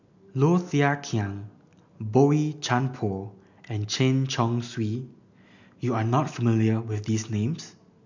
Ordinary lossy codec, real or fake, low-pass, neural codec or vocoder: none; real; 7.2 kHz; none